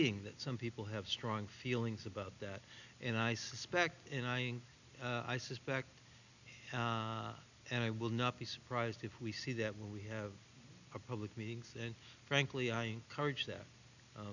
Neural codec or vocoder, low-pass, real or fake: none; 7.2 kHz; real